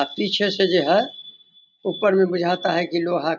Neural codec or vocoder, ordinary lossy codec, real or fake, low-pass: none; none; real; 7.2 kHz